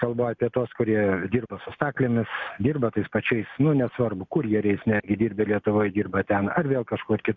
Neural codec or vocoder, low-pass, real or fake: none; 7.2 kHz; real